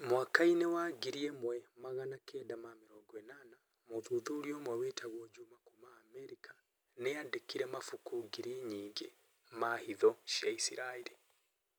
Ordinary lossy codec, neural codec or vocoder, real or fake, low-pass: none; none; real; none